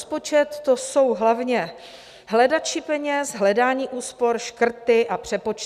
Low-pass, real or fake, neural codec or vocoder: 14.4 kHz; real; none